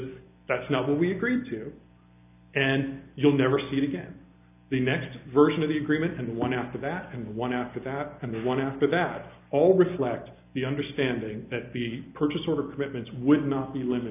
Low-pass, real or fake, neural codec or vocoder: 3.6 kHz; real; none